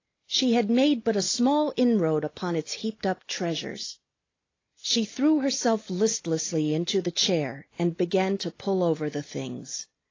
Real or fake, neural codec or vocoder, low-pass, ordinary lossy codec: real; none; 7.2 kHz; AAC, 32 kbps